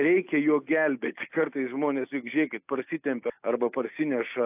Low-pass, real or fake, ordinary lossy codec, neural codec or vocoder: 3.6 kHz; real; AAC, 32 kbps; none